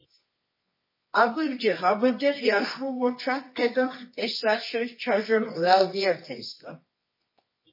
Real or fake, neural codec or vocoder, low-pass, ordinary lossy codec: fake; codec, 24 kHz, 0.9 kbps, WavTokenizer, medium music audio release; 5.4 kHz; MP3, 24 kbps